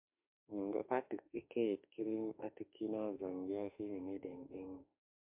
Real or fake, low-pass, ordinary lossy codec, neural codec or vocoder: fake; 3.6 kHz; MP3, 32 kbps; autoencoder, 48 kHz, 32 numbers a frame, DAC-VAE, trained on Japanese speech